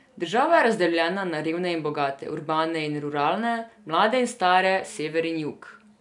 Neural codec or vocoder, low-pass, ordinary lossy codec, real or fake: none; 10.8 kHz; none; real